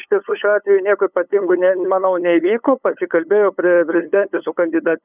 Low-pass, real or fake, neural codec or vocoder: 3.6 kHz; fake; codec, 16 kHz, 8 kbps, FunCodec, trained on LibriTTS, 25 frames a second